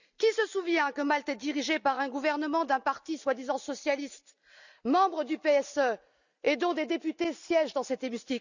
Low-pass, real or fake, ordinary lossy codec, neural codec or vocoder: 7.2 kHz; real; none; none